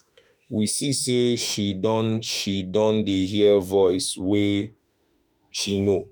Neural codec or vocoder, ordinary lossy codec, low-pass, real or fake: autoencoder, 48 kHz, 32 numbers a frame, DAC-VAE, trained on Japanese speech; none; none; fake